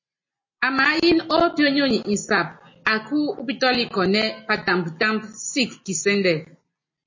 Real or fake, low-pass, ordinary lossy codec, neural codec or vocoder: real; 7.2 kHz; MP3, 32 kbps; none